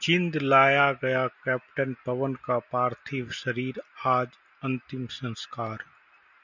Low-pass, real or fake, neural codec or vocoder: 7.2 kHz; real; none